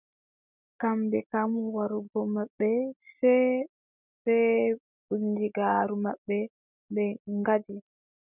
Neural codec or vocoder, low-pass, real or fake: none; 3.6 kHz; real